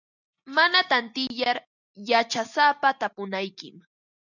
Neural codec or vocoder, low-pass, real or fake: none; 7.2 kHz; real